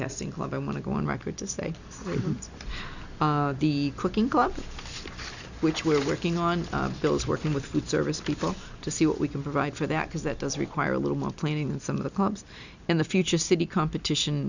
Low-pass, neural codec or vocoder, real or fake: 7.2 kHz; none; real